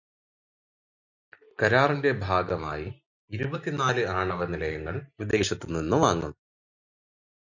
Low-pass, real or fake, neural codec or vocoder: 7.2 kHz; real; none